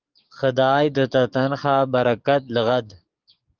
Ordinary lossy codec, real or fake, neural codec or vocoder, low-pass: Opus, 24 kbps; fake; codec, 44.1 kHz, 7.8 kbps, DAC; 7.2 kHz